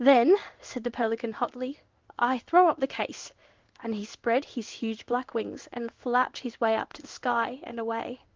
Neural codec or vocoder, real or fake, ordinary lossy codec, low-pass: codec, 16 kHz in and 24 kHz out, 1 kbps, XY-Tokenizer; fake; Opus, 32 kbps; 7.2 kHz